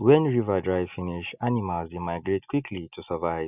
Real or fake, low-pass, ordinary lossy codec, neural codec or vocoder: real; 3.6 kHz; none; none